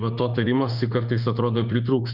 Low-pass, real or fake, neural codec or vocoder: 5.4 kHz; fake; autoencoder, 48 kHz, 32 numbers a frame, DAC-VAE, trained on Japanese speech